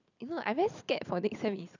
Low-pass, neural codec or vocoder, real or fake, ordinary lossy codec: 7.2 kHz; none; real; none